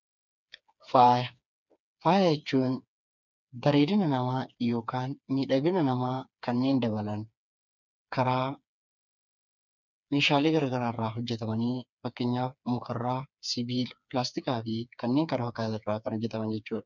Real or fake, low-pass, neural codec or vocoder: fake; 7.2 kHz; codec, 16 kHz, 4 kbps, FreqCodec, smaller model